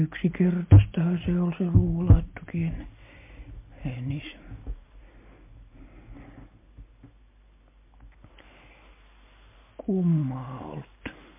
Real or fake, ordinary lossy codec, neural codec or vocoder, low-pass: real; MP3, 24 kbps; none; 3.6 kHz